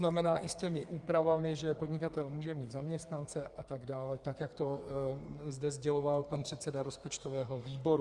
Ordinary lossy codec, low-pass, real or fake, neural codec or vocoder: Opus, 32 kbps; 10.8 kHz; fake; codec, 32 kHz, 1.9 kbps, SNAC